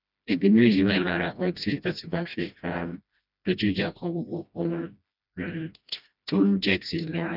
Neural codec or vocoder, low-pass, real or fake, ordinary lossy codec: codec, 16 kHz, 1 kbps, FreqCodec, smaller model; 5.4 kHz; fake; none